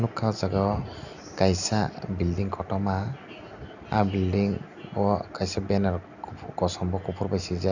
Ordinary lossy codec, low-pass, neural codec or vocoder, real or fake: none; 7.2 kHz; none; real